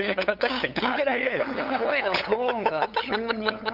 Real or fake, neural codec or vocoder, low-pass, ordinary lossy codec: fake; codec, 16 kHz, 8 kbps, FunCodec, trained on LibriTTS, 25 frames a second; 5.4 kHz; none